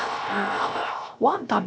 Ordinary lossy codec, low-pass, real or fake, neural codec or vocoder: none; none; fake; codec, 16 kHz, 0.3 kbps, FocalCodec